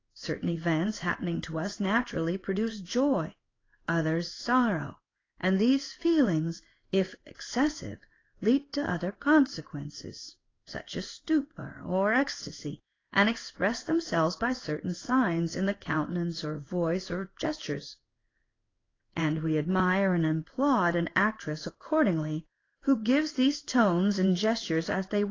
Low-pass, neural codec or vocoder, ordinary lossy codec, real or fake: 7.2 kHz; codec, 16 kHz in and 24 kHz out, 1 kbps, XY-Tokenizer; AAC, 32 kbps; fake